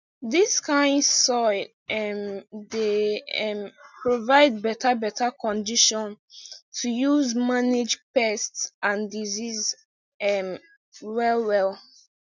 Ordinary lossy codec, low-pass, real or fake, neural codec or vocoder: none; 7.2 kHz; real; none